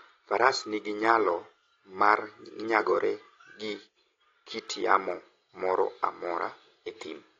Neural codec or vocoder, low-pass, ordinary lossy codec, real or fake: none; 7.2 kHz; AAC, 32 kbps; real